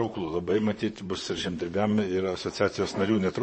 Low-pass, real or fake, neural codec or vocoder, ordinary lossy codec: 9.9 kHz; fake; vocoder, 44.1 kHz, 128 mel bands, Pupu-Vocoder; MP3, 32 kbps